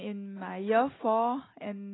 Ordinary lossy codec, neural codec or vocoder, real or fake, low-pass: AAC, 16 kbps; none; real; 7.2 kHz